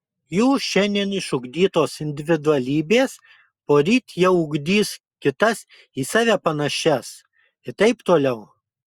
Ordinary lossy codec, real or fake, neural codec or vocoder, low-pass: Opus, 64 kbps; real; none; 19.8 kHz